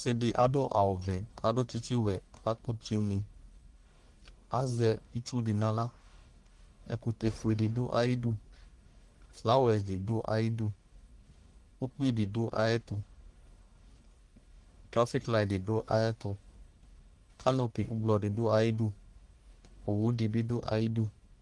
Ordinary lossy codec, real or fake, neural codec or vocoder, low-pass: Opus, 24 kbps; fake; codec, 44.1 kHz, 1.7 kbps, Pupu-Codec; 10.8 kHz